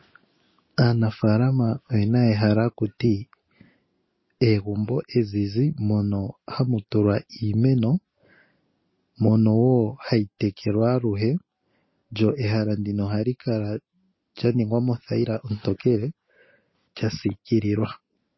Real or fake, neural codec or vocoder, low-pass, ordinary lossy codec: real; none; 7.2 kHz; MP3, 24 kbps